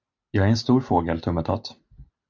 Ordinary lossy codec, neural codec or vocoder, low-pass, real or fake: AAC, 48 kbps; none; 7.2 kHz; real